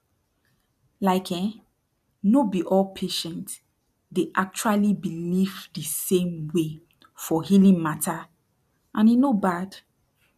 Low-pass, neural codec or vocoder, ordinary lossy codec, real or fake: 14.4 kHz; none; none; real